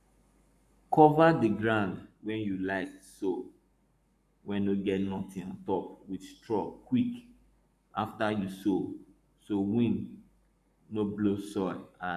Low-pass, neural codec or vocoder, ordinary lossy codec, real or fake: 14.4 kHz; codec, 44.1 kHz, 7.8 kbps, Pupu-Codec; none; fake